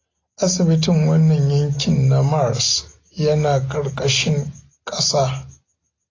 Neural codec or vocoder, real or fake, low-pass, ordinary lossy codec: none; real; 7.2 kHz; AAC, 32 kbps